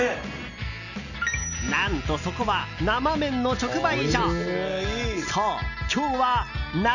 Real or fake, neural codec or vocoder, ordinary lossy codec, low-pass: real; none; none; 7.2 kHz